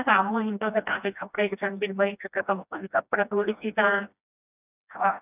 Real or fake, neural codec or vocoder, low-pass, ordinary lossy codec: fake; codec, 16 kHz, 1 kbps, FreqCodec, smaller model; 3.6 kHz; none